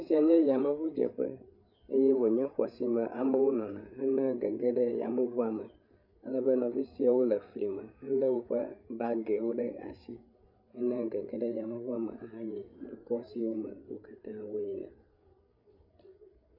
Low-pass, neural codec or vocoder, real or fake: 5.4 kHz; codec, 16 kHz, 8 kbps, FreqCodec, larger model; fake